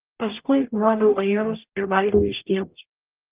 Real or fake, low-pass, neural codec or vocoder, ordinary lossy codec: fake; 3.6 kHz; codec, 44.1 kHz, 0.9 kbps, DAC; Opus, 24 kbps